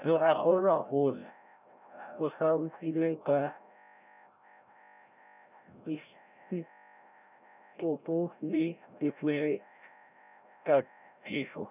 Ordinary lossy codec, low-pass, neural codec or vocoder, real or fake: none; 3.6 kHz; codec, 16 kHz, 0.5 kbps, FreqCodec, larger model; fake